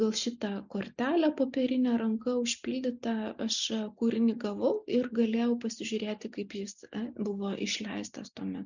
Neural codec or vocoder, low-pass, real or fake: none; 7.2 kHz; real